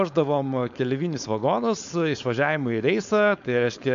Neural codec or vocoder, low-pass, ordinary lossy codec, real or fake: codec, 16 kHz, 4.8 kbps, FACodec; 7.2 kHz; MP3, 64 kbps; fake